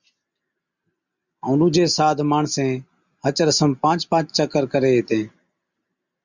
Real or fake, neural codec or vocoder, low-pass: real; none; 7.2 kHz